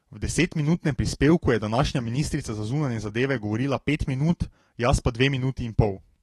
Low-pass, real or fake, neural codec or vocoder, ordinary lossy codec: 19.8 kHz; fake; autoencoder, 48 kHz, 128 numbers a frame, DAC-VAE, trained on Japanese speech; AAC, 32 kbps